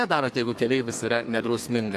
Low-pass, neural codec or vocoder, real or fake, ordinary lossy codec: 14.4 kHz; codec, 32 kHz, 1.9 kbps, SNAC; fake; AAC, 64 kbps